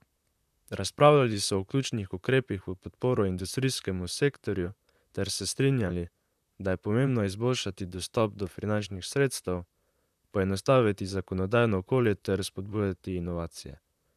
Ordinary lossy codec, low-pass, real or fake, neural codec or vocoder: none; 14.4 kHz; fake; vocoder, 44.1 kHz, 128 mel bands, Pupu-Vocoder